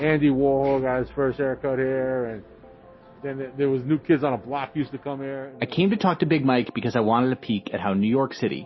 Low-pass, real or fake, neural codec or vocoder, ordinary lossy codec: 7.2 kHz; real; none; MP3, 24 kbps